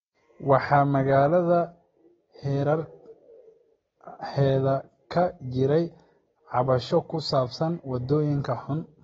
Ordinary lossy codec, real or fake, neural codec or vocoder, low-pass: AAC, 24 kbps; real; none; 7.2 kHz